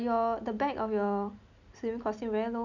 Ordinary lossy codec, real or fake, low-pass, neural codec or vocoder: none; real; 7.2 kHz; none